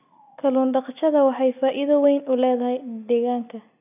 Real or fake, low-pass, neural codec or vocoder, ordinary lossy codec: real; 3.6 kHz; none; none